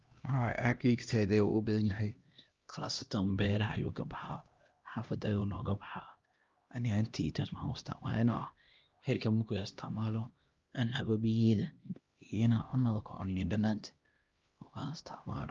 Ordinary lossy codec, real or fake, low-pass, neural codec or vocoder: Opus, 32 kbps; fake; 7.2 kHz; codec, 16 kHz, 1 kbps, X-Codec, HuBERT features, trained on LibriSpeech